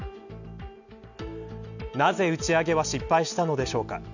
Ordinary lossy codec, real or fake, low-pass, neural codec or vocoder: none; real; 7.2 kHz; none